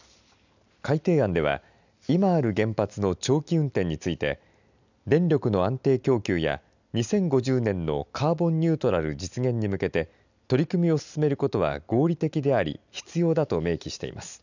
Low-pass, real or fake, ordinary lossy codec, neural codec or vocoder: 7.2 kHz; real; none; none